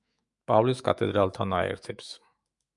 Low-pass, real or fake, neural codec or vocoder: 10.8 kHz; fake; autoencoder, 48 kHz, 128 numbers a frame, DAC-VAE, trained on Japanese speech